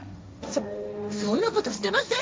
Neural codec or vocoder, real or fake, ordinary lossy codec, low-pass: codec, 16 kHz, 1.1 kbps, Voila-Tokenizer; fake; none; none